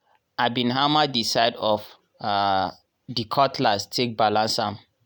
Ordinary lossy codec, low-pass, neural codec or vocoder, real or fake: none; none; none; real